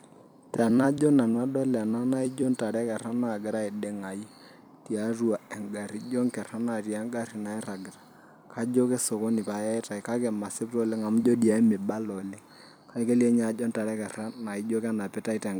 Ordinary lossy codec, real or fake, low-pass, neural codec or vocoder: none; fake; none; vocoder, 44.1 kHz, 128 mel bands every 256 samples, BigVGAN v2